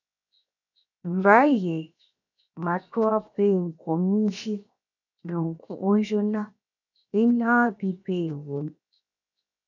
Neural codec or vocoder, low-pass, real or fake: codec, 16 kHz, 0.7 kbps, FocalCodec; 7.2 kHz; fake